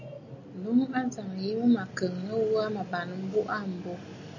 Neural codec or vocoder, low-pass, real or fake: none; 7.2 kHz; real